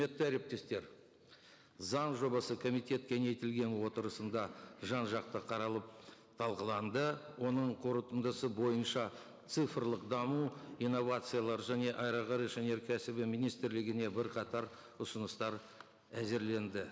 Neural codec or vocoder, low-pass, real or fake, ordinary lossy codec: none; none; real; none